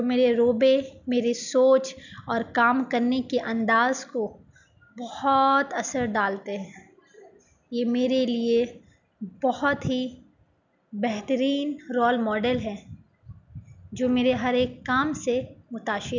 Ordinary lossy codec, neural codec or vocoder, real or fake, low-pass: none; none; real; 7.2 kHz